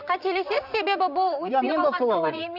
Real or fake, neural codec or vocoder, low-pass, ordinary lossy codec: fake; vocoder, 44.1 kHz, 128 mel bands, Pupu-Vocoder; 5.4 kHz; none